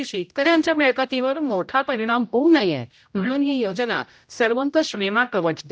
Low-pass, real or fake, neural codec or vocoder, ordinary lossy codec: none; fake; codec, 16 kHz, 0.5 kbps, X-Codec, HuBERT features, trained on general audio; none